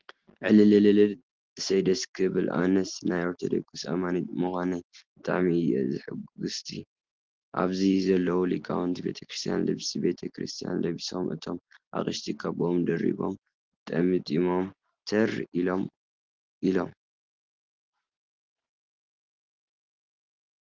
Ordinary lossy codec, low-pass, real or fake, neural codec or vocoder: Opus, 16 kbps; 7.2 kHz; real; none